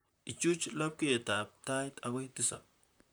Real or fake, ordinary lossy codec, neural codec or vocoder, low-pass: fake; none; vocoder, 44.1 kHz, 128 mel bands, Pupu-Vocoder; none